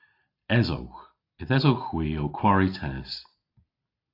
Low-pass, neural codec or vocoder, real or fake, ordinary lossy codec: 5.4 kHz; none; real; AAC, 32 kbps